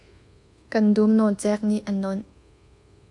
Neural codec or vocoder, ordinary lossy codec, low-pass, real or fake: codec, 24 kHz, 1.2 kbps, DualCodec; AAC, 64 kbps; 10.8 kHz; fake